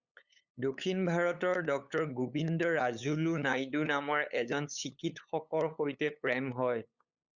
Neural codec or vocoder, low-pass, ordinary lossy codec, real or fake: codec, 16 kHz, 8 kbps, FunCodec, trained on LibriTTS, 25 frames a second; 7.2 kHz; Opus, 64 kbps; fake